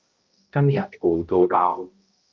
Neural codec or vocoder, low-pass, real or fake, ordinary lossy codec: codec, 16 kHz, 0.5 kbps, X-Codec, HuBERT features, trained on balanced general audio; 7.2 kHz; fake; Opus, 32 kbps